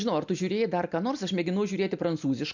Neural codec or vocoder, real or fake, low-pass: none; real; 7.2 kHz